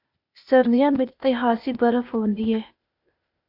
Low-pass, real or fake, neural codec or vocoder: 5.4 kHz; fake; codec, 16 kHz, 0.8 kbps, ZipCodec